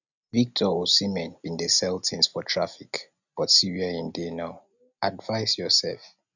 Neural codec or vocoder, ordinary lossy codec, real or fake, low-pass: none; none; real; 7.2 kHz